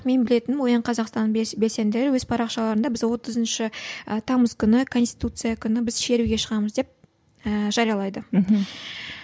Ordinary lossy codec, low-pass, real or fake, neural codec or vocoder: none; none; real; none